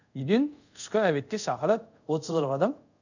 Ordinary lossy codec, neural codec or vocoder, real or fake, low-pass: none; codec, 24 kHz, 0.5 kbps, DualCodec; fake; 7.2 kHz